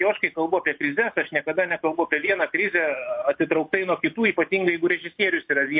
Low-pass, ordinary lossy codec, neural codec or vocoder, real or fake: 5.4 kHz; MP3, 32 kbps; none; real